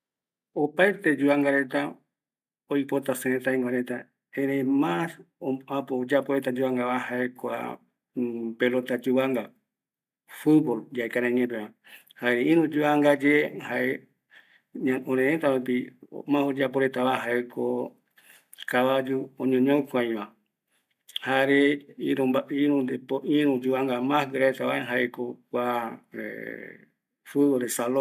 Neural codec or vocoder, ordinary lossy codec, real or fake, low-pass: none; none; real; 14.4 kHz